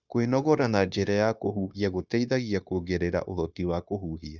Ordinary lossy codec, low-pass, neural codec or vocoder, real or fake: none; 7.2 kHz; codec, 16 kHz, 0.9 kbps, LongCat-Audio-Codec; fake